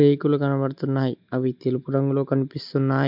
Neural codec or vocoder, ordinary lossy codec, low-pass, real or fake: none; MP3, 48 kbps; 5.4 kHz; real